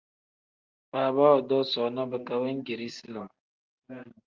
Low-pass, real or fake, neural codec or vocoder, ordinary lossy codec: 7.2 kHz; real; none; Opus, 24 kbps